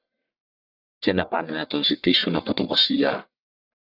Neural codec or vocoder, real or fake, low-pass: codec, 44.1 kHz, 1.7 kbps, Pupu-Codec; fake; 5.4 kHz